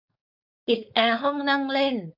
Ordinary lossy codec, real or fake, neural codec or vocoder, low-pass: AAC, 48 kbps; fake; codec, 16 kHz, 4.8 kbps, FACodec; 5.4 kHz